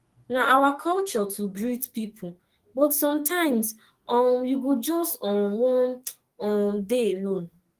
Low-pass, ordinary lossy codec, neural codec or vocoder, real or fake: 14.4 kHz; Opus, 24 kbps; codec, 44.1 kHz, 2.6 kbps, SNAC; fake